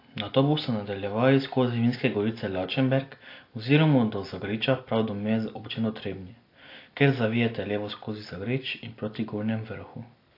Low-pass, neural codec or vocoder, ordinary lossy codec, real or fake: 5.4 kHz; none; AAC, 32 kbps; real